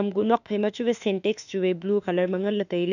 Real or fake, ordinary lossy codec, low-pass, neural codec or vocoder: fake; none; 7.2 kHz; autoencoder, 48 kHz, 32 numbers a frame, DAC-VAE, trained on Japanese speech